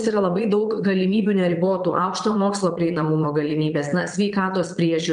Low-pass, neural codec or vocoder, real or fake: 9.9 kHz; vocoder, 22.05 kHz, 80 mel bands, Vocos; fake